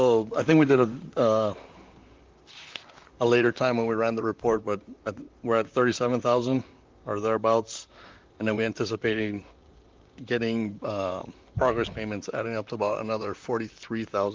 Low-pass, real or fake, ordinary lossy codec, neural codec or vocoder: 7.2 kHz; fake; Opus, 24 kbps; vocoder, 44.1 kHz, 128 mel bands, Pupu-Vocoder